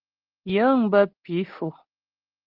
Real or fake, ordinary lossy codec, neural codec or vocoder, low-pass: real; Opus, 16 kbps; none; 5.4 kHz